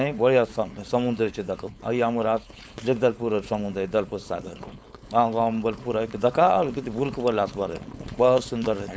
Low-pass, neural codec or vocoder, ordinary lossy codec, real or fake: none; codec, 16 kHz, 4.8 kbps, FACodec; none; fake